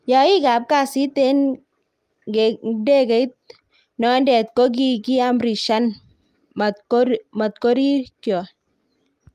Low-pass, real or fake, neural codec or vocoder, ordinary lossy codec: 14.4 kHz; real; none; Opus, 32 kbps